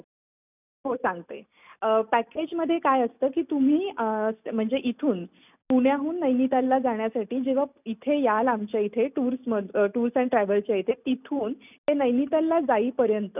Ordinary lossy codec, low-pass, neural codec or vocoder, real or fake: none; 3.6 kHz; none; real